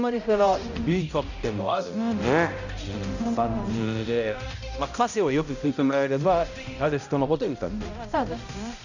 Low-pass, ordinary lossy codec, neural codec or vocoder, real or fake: 7.2 kHz; none; codec, 16 kHz, 0.5 kbps, X-Codec, HuBERT features, trained on balanced general audio; fake